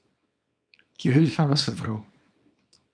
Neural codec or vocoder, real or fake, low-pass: codec, 24 kHz, 0.9 kbps, WavTokenizer, small release; fake; 9.9 kHz